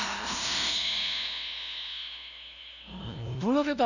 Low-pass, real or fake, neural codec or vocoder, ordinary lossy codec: 7.2 kHz; fake; codec, 16 kHz, 0.5 kbps, FunCodec, trained on LibriTTS, 25 frames a second; none